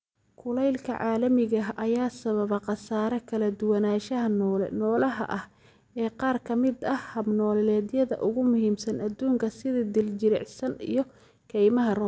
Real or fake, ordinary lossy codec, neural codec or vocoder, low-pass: real; none; none; none